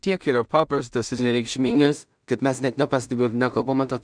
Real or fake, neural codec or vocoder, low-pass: fake; codec, 16 kHz in and 24 kHz out, 0.4 kbps, LongCat-Audio-Codec, two codebook decoder; 9.9 kHz